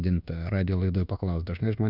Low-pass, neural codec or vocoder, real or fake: 5.4 kHz; codec, 24 kHz, 1.2 kbps, DualCodec; fake